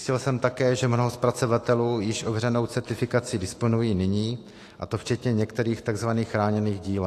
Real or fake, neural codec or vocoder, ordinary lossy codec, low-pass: fake; autoencoder, 48 kHz, 128 numbers a frame, DAC-VAE, trained on Japanese speech; AAC, 48 kbps; 14.4 kHz